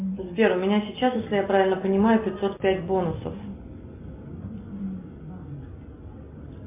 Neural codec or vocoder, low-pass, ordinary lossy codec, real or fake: none; 3.6 kHz; MP3, 32 kbps; real